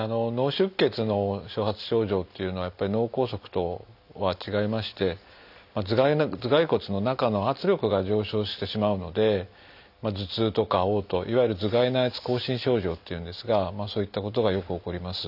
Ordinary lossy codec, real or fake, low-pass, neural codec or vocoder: none; real; 5.4 kHz; none